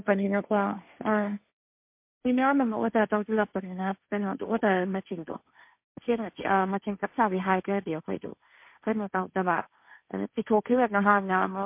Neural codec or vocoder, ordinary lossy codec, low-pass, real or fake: codec, 16 kHz, 1.1 kbps, Voila-Tokenizer; MP3, 32 kbps; 3.6 kHz; fake